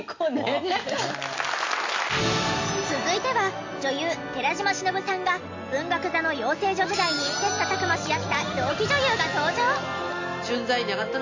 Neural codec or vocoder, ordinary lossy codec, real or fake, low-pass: none; MP3, 48 kbps; real; 7.2 kHz